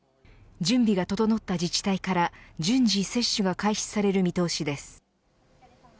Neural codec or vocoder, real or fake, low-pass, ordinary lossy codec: none; real; none; none